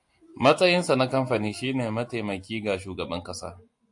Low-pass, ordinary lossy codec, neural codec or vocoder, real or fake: 10.8 kHz; AAC, 64 kbps; none; real